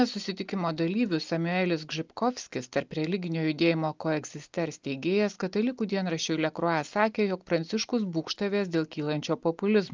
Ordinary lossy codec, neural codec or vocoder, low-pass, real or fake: Opus, 32 kbps; none; 7.2 kHz; real